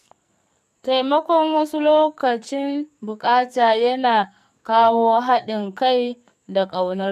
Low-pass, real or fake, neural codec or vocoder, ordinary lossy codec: 14.4 kHz; fake; codec, 44.1 kHz, 2.6 kbps, SNAC; none